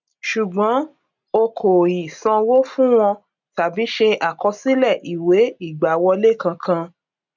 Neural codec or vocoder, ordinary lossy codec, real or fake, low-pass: none; none; real; 7.2 kHz